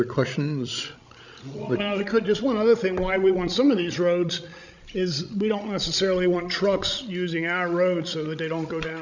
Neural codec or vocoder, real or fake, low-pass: codec, 16 kHz, 16 kbps, FreqCodec, larger model; fake; 7.2 kHz